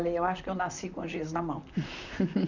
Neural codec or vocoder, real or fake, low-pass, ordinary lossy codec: vocoder, 44.1 kHz, 128 mel bands, Pupu-Vocoder; fake; 7.2 kHz; none